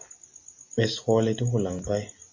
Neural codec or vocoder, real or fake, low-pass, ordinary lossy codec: none; real; 7.2 kHz; MP3, 32 kbps